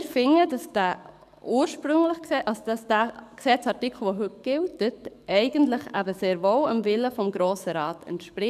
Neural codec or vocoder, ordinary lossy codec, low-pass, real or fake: codec, 44.1 kHz, 7.8 kbps, DAC; none; 14.4 kHz; fake